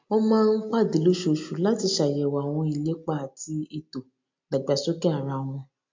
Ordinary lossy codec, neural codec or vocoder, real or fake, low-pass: MP3, 48 kbps; none; real; 7.2 kHz